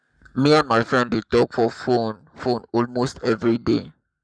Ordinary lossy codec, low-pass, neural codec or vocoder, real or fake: none; 9.9 kHz; codec, 44.1 kHz, 7.8 kbps, Pupu-Codec; fake